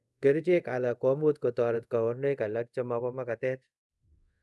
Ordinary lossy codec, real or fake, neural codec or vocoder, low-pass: none; fake; codec, 24 kHz, 0.5 kbps, DualCodec; none